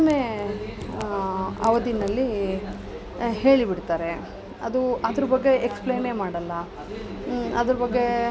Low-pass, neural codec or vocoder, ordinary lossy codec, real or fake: none; none; none; real